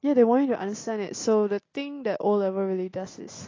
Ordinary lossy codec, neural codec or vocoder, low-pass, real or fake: AAC, 32 kbps; none; 7.2 kHz; real